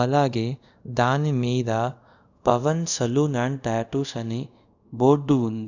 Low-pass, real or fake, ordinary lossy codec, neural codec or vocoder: 7.2 kHz; fake; none; codec, 24 kHz, 0.5 kbps, DualCodec